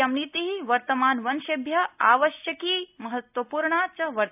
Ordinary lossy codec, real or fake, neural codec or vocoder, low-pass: none; real; none; 3.6 kHz